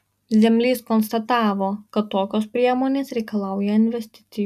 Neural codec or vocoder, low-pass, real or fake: none; 14.4 kHz; real